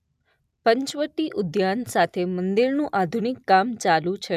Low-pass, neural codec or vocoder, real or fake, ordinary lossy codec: 14.4 kHz; none; real; none